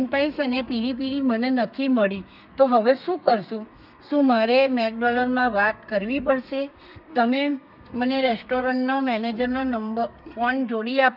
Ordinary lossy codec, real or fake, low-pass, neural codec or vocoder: none; fake; 5.4 kHz; codec, 32 kHz, 1.9 kbps, SNAC